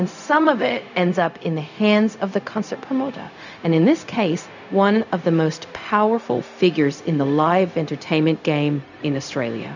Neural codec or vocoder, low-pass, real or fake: codec, 16 kHz, 0.4 kbps, LongCat-Audio-Codec; 7.2 kHz; fake